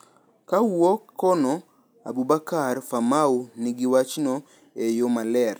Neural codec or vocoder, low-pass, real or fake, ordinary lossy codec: none; none; real; none